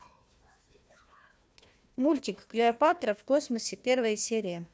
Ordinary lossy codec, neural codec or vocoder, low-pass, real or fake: none; codec, 16 kHz, 1 kbps, FunCodec, trained on Chinese and English, 50 frames a second; none; fake